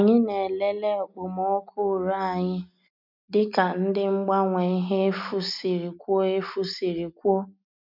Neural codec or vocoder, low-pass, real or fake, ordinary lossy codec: none; 5.4 kHz; real; none